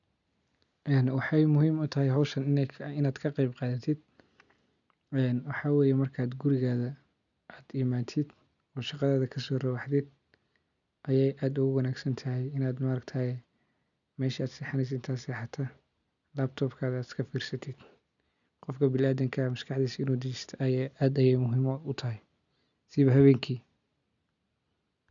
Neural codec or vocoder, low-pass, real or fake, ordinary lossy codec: none; 7.2 kHz; real; none